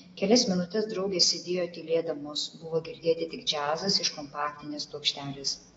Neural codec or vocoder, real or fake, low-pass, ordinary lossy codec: autoencoder, 48 kHz, 128 numbers a frame, DAC-VAE, trained on Japanese speech; fake; 19.8 kHz; AAC, 24 kbps